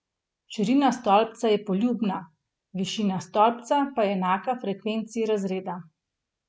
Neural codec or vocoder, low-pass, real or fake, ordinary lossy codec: none; none; real; none